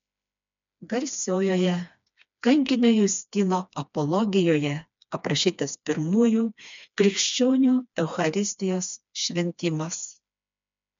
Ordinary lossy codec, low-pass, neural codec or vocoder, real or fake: MP3, 64 kbps; 7.2 kHz; codec, 16 kHz, 2 kbps, FreqCodec, smaller model; fake